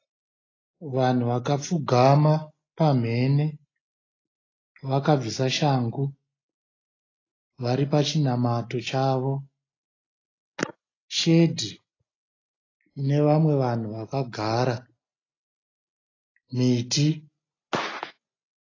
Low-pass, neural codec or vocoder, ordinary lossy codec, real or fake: 7.2 kHz; none; AAC, 32 kbps; real